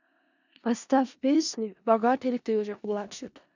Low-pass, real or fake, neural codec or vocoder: 7.2 kHz; fake; codec, 16 kHz in and 24 kHz out, 0.4 kbps, LongCat-Audio-Codec, four codebook decoder